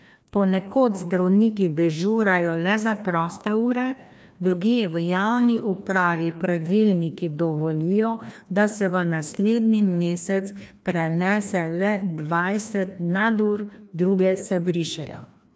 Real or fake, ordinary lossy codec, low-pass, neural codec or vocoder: fake; none; none; codec, 16 kHz, 1 kbps, FreqCodec, larger model